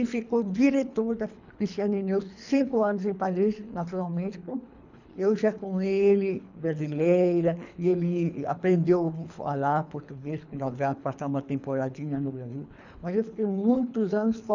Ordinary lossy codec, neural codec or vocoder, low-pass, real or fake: none; codec, 24 kHz, 3 kbps, HILCodec; 7.2 kHz; fake